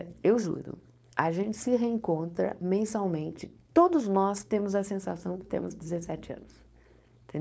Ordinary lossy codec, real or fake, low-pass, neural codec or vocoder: none; fake; none; codec, 16 kHz, 4.8 kbps, FACodec